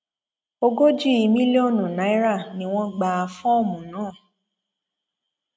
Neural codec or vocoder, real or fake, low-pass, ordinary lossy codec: none; real; none; none